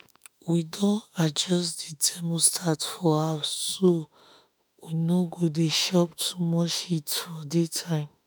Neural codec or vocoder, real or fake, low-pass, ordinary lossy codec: autoencoder, 48 kHz, 32 numbers a frame, DAC-VAE, trained on Japanese speech; fake; none; none